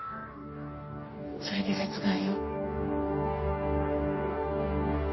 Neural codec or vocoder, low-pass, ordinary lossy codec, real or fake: codec, 44.1 kHz, 2.6 kbps, DAC; 7.2 kHz; MP3, 24 kbps; fake